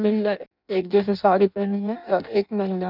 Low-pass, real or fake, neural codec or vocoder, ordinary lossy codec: 5.4 kHz; fake; codec, 16 kHz in and 24 kHz out, 0.6 kbps, FireRedTTS-2 codec; none